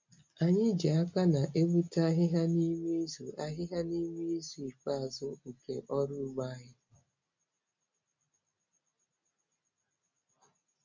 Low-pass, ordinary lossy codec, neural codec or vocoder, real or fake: 7.2 kHz; MP3, 64 kbps; none; real